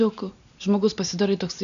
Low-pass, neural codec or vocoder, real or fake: 7.2 kHz; none; real